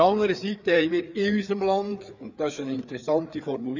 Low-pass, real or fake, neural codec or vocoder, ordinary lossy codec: 7.2 kHz; fake; codec, 16 kHz, 4 kbps, FreqCodec, larger model; none